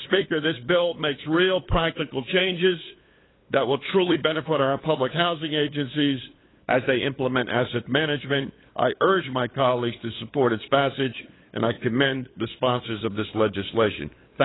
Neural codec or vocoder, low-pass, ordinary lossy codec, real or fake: codec, 16 kHz, 8 kbps, FunCodec, trained on LibriTTS, 25 frames a second; 7.2 kHz; AAC, 16 kbps; fake